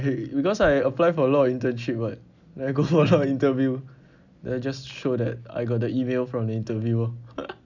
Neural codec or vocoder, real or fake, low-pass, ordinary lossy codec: none; real; 7.2 kHz; none